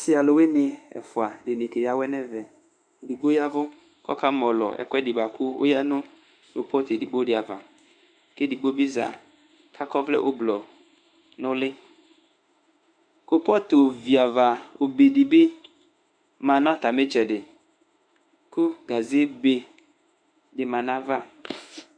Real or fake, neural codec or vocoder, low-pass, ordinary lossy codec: fake; autoencoder, 48 kHz, 32 numbers a frame, DAC-VAE, trained on Japanese speech; 9.9 kHz; MP3, 96 kbps